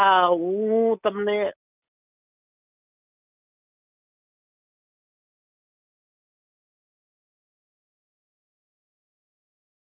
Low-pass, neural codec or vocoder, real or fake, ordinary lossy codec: 3.6 kHz; none; real; none